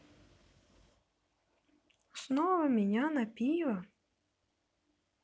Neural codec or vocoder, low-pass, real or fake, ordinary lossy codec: none; none; real; none